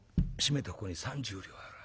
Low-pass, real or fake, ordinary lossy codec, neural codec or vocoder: none; real; none; none